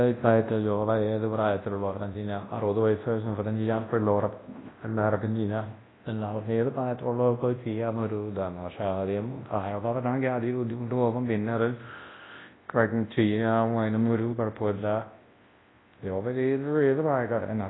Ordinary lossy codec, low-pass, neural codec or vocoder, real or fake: AAC, 16 kbps; 7.2 kHz; codec, 24 kHz, 0.9 kbps, WavTokenizer, large speech release; fake